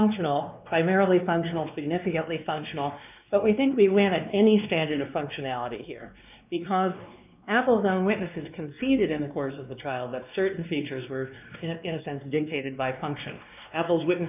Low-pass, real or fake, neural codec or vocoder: 3.6 kHz; fake; codec, 16 kHz, 2 kbps, X-Codec, WavLM features, trained on Multilingual LibriSpeech